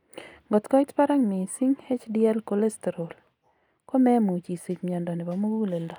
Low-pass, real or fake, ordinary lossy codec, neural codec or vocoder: 19.8 kHz; real; none; none